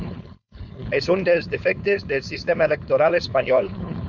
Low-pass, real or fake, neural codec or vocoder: 7.2 kHz; fake; codec, 16 kHz, 4.8 kbps, FACodec